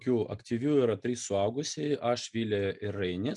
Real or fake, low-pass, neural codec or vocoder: real; 10.8 kHz; none